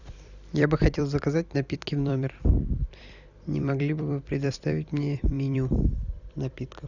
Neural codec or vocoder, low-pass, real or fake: vocoder, 44.1 kHz, 128 mel bands every 256 samples, BigVGAN v2; 7.2 kHz; fake